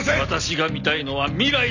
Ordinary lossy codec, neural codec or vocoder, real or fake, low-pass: none; none; real; 7.2 kHz